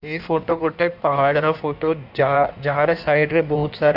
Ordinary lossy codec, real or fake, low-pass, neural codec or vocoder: none; fake; 5.4 kHz; codec, 16 kHz in and 24 kHz out, 1.1 kbps, FireRedTTS-2 codec